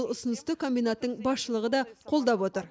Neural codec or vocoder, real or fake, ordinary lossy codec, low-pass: none; real; none; none